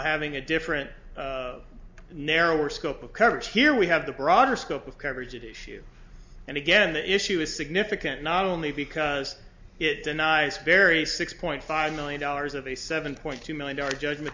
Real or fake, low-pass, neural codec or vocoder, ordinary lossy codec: real; 7.2 kHz; none; MP3, 64 kbps